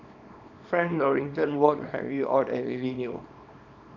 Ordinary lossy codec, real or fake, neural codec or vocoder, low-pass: none; fake; codec, 24 kHz, 0.9 kbps, WavTokenizer, small release; 7.2 kHz